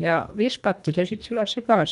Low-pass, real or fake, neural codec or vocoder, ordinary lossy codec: 10.8 kHz; fake; codec, 24 kHz, 1.5 kbps, HILCodec; none